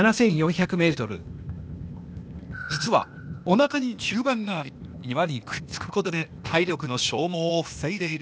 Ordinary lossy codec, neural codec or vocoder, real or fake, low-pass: none; codec, 16 kHz, 0.8 kbps, ZipCodec; fake; none